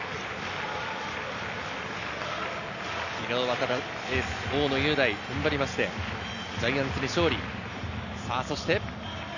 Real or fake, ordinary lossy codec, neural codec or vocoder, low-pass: real; none; none; 7.2 kHz